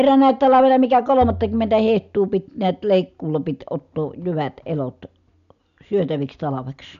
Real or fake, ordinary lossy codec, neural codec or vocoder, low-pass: real; none; none; 7.2 kHz